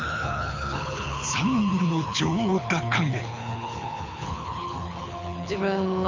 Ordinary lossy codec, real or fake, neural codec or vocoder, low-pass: MP3, 64 kbps; fake; codec, 24 kHz, 6 kbps, HILCodec; 7.2 kHz